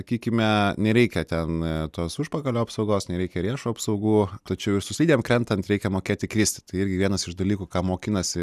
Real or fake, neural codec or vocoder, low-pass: real; none; 14.4 kHz